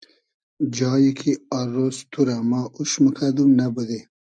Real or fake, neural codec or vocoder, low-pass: real; none; 9.9 kHz